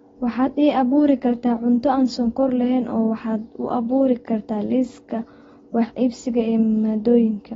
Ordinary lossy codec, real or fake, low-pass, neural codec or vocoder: AAC, 24 kbps; real; 7.2 kHz; none